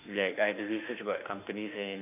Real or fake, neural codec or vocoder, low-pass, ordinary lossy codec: fake; autoencoder, 48 kHz, 32 numbers a frame, DAC-VAE, trained on Japanese speech; 3.6 kHz; AAC, 32 kbps